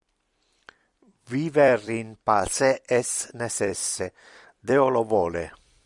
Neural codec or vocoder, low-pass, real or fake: vocoder, 44.1 kHz, 128 mel bands every 256 samples, BigVGAN v2; 10.8 kHz; fake